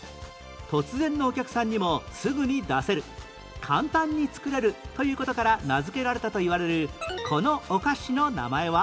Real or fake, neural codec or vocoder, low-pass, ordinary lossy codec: real; none; none; none